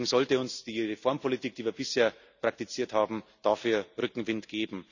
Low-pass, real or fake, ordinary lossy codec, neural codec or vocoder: 7.2 kHz; real; none; none